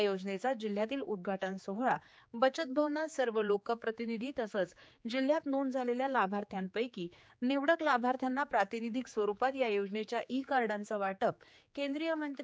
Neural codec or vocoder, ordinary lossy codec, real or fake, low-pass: codec, 16 kHz, 4 kbps, X-Codec, HuBERT features, trained on general audio; none; fake; none